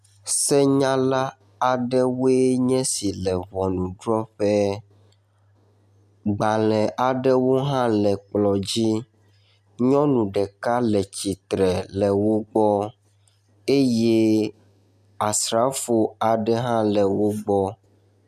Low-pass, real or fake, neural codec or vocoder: 14.4 kHz; real; none